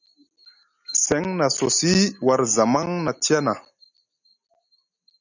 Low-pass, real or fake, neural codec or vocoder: 7.2 kHz; real; none